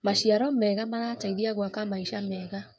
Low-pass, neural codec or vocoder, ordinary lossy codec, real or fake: none; codec, 16 kHz, 16 kbps, FreqCodec, smaller model; none; fake